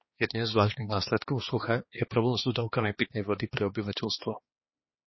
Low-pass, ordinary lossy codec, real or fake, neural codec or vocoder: 7.2 kHz; MP3, 24 kbps; fake; codec, 16 kHz, 2 kbps, X-Codec, HuBERT features, trained on balanced general audio